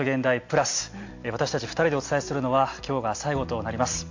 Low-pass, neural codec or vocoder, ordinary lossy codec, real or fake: 7.2 kHz; none; AAC, 48 kbps; real